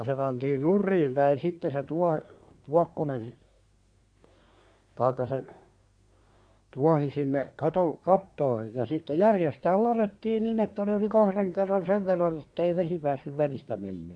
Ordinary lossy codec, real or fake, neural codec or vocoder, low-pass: AAC, 64 kbps; fake; codec, 24 kHz, 1 kbps, SNAC; 9.9 kHz